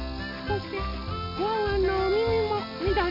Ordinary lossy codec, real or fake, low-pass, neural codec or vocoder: MP3, 32 kbps; real; 5.4 kHz; none